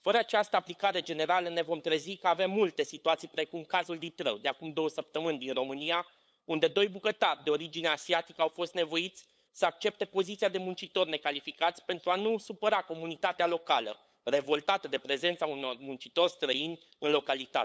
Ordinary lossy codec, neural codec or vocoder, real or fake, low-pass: none; codec, 16 kHz, 8 kbps, FunCodec, trained on LibriTTS, 25 frames a second; fake; none